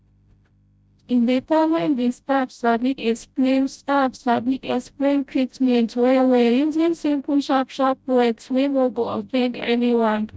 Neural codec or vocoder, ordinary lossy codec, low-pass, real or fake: codec, 16 kHz, 0.5 kbps, FreqCodec, smaller model; none; none; fake